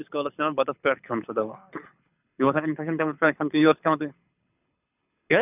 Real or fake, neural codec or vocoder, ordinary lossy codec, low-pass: fake; codec, 24 kHz, 6 kbps, HILCodec; none; 3.6 kHz